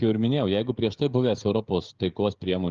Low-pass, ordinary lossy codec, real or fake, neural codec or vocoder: 7.2 kHz; Opus, 32 kbps; fake; codec, 16 kHz, 16 kbps, FreqCodec, smaller model